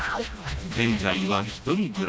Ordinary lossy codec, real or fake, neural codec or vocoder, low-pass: none; fake; codec, 16 kHz, 1 kbps, FreqCodec, smaller model; none